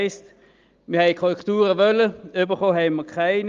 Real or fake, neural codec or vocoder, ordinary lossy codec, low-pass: real; none; Opus, 32 kbps; 7.2 kHz